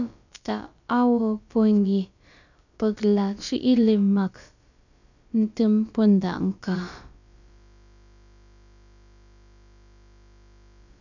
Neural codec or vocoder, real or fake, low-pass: codec, 16 kHz, about 1 kbps, DyCAST, with the encoder's durations; fake; 7.2 kHz